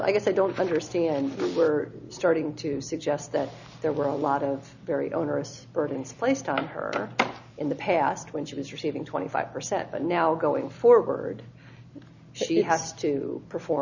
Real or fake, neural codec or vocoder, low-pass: real; none; 7.2 kHz